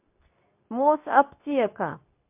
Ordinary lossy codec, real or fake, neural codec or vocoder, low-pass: MP3, 32 kbps; fake; codec, 24 kHz, 0.9 kbps, WavTokenizer, medium speech release version 2; 3.6 kHz